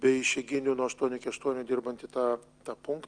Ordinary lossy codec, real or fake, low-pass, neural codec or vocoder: Opus, 32 kbps; real; 9.9 kHz; none